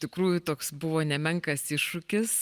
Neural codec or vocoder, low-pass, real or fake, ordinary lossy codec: none; 14.4 kHz; real; Opus, 32 kbps